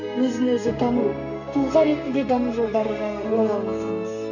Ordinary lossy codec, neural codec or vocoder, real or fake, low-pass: none; codec, 32 kHz, 1.9 kbps, SNAC; fake; 7.2 kHz